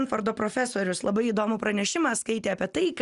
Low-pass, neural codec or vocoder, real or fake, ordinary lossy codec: 10.8 kHz; none; real; Opus, 64 kbps